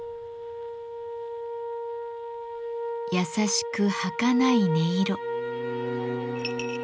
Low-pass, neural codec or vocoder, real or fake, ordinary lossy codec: none; none; real; none